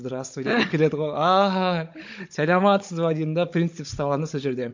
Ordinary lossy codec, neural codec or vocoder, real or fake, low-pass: MP3, 48 kbps; codec, 16 kHz, 16 kbps, FunCodec, trained on Chinese and English, 50 frames a second; fake; 7.2 kHz